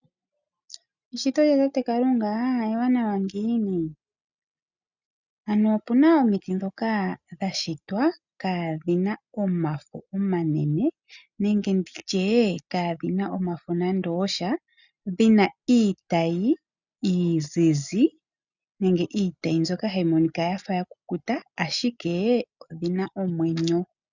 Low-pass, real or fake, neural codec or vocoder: 7.2 kHz; real; none